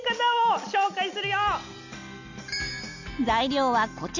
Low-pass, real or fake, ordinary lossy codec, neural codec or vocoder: 7.2 kHz; real; none; none